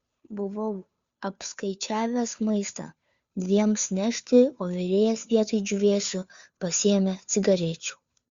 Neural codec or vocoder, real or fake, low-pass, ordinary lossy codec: codec, 16 kHz, 2 kbps, FunCodec, trained on Chinese and English, 25 frames a second; fake; 7.2 kHz; Opus, 64 kbps